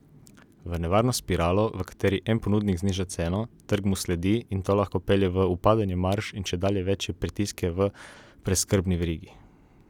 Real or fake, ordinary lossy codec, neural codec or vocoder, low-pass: real; none; none; 19.8 kHz